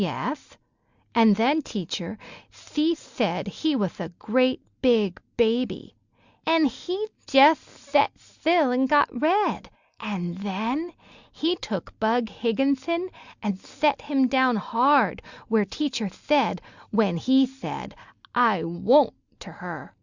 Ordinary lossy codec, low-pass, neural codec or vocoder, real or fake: Opus, 64 kbps; 7.2 kHz; none; real